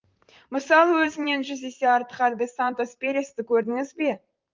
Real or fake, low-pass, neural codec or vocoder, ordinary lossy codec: fake; 7.2 kHz; vocoder, 44.1 kHz, 128 mel bands, Pupu-Vocoder; Opus, 32 kbps